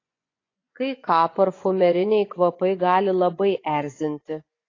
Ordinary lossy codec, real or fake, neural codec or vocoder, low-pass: AAC, 32 kbps; fake; vocoder, 24 kHz, 100 mel bands, Vocos; 7.2 kHz